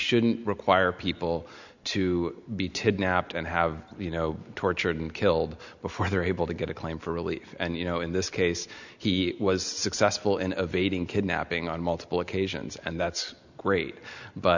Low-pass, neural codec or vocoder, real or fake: 7.2 kHz; none; real